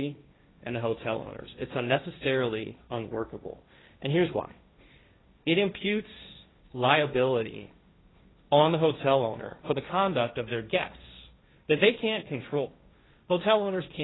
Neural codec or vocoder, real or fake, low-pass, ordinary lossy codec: codec, 16 kHz, 1.1 kbps, Voila-Tokenizer; fake; 7.2 kHz; AAC, 16 kbps